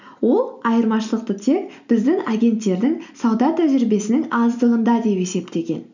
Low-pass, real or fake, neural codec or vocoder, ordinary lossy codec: 7.2 kHz; real; none; none